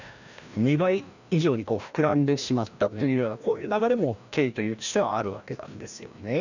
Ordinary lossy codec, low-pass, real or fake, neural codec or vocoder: none; 7.2 kHz; fake; codec, 16 kHz, 1 kbps, FreqCodec, larger model